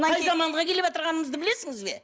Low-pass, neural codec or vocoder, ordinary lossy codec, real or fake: none; none; none; real